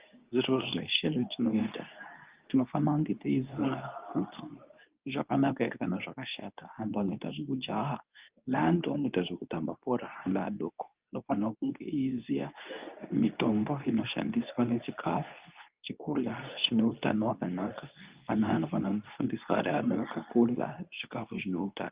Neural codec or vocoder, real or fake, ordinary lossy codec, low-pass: codec, 24 kHz, 0.9 kbps, WavTokenizer, medium speech release version 2; fake; Opus, 24 kbps; 3.6 kHz